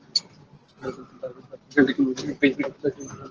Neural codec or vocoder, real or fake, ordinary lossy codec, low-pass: none; real; Opus, 16 kbps; 7.2 kHz